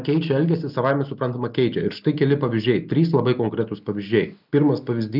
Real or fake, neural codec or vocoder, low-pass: real; none; 5.4 kHz